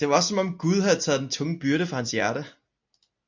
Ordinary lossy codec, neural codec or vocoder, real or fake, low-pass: MP3, 48 kbps; none; real; 7.2 kHz